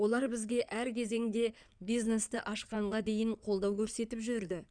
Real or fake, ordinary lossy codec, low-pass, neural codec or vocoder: fake; none; 9.9 kHz; codec, 16 kHz in and 24 kHz out, 2.2 kbps, FireRedTTS-2 codec